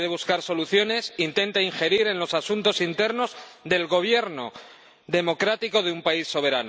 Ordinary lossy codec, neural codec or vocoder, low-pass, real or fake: none; none; none; real